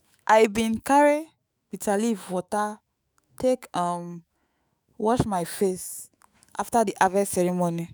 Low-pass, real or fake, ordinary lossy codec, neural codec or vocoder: none; fake; none; autoencoder, 48 kHz, 128 numbers a frame, DAC-VAE, trained on Japanese speech